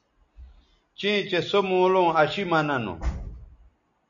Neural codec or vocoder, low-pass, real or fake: none; 7.2 kHz; real